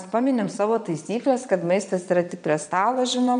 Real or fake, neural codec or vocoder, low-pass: fake; vocoder, 22.05 kHz, 80 mel bands, Vocos; 9.9 kHz